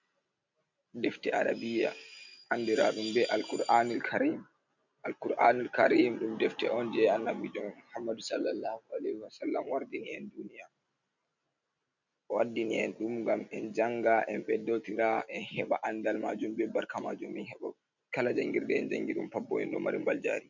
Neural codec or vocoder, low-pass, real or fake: none; 7.2 kHz; real